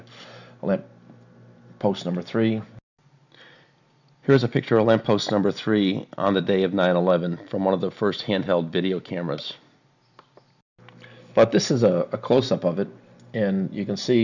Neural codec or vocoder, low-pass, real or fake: none; 7.2 kHz; real